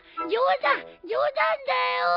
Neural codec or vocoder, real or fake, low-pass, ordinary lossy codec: none; real; 5.4 kHz; none